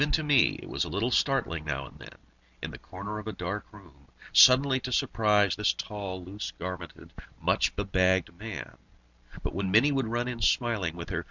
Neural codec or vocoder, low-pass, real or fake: none; 7.2 kHz; real